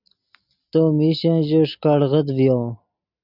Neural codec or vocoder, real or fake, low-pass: none; real; 5.4 kHz